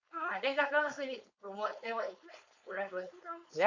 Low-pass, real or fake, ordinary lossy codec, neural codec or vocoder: 7.2 kHz; fake; AAC, 48 kbps; codec, 16 kHz, 4.8 kbps, FACodec